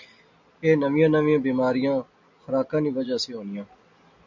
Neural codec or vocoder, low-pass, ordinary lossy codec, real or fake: none; 7.2 kHz; MP3, 64 kbps; real